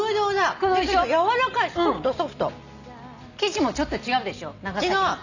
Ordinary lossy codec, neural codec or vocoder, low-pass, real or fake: none; none; 7.2 kHz; real